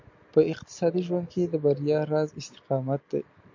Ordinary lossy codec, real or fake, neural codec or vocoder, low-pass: MP3, 48 kbps; real; none; 7.2 kHz